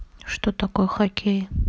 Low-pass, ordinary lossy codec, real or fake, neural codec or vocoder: none; none; real; none